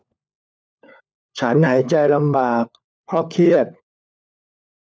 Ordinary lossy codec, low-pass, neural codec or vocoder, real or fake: none; none; codec, 16 kHz, 4 kbps, FunCodec, trained on LibriTTS, 50 frames a second; fake